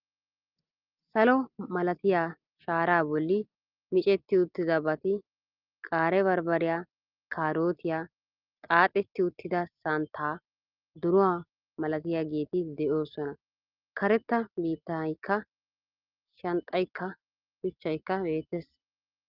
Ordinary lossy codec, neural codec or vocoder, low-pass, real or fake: Opus, 32 kbps; none; 5.4 kHz; real